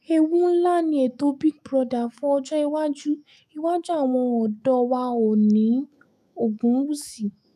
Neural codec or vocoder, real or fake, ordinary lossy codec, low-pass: autoencoder, 48 kHz, 128 numbers a frame, DAC-VAE, trained on Japanese speech; fake; none; 14.4 kHz